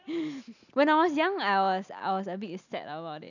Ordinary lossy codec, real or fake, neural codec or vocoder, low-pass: none; real; none; 7.2 kHz